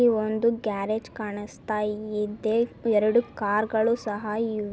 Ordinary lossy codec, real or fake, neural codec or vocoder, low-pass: none; real; none; none